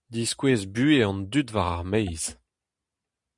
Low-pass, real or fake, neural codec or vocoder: 10.8 kHz; real; none